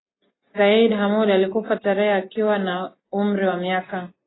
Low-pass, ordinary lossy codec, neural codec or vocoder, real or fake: 7.2 kHz; AAC, 16 kbps; none; real